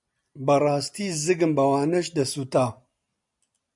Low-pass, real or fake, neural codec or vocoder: 10.8 kHz; real; none